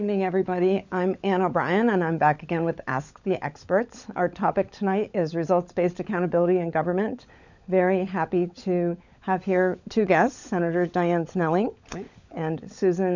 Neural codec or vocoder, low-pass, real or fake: codec, 16 kHz, 16 kbps, FunCodec, trained on LibriTTS, 50 frames a second; 7.2 kHz; fake